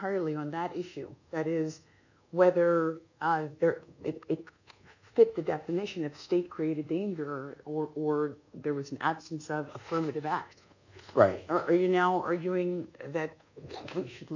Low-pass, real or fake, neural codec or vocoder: 7.2 kHz; fake; codec, 24 kHz, 1.2 kbps, DualCodec